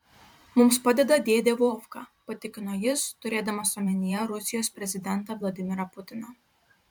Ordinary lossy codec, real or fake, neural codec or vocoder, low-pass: MP3, 96 kbps; fake; vocoder, 44.1 kHz, 128 mel bands every 512 samples, BigVGAN v2; 19.8 kHz